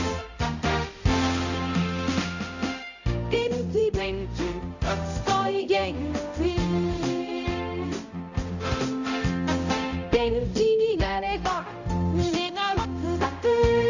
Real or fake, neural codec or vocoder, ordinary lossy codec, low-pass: fake; codec, 16 kHz, 0.5 kbps, X-Codec, HuBERT features, trained on balanced general audio; none; 7.2 kHz